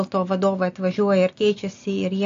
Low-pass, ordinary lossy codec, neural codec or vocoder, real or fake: 7.2 kHz; AAC, 48 kbps; none; real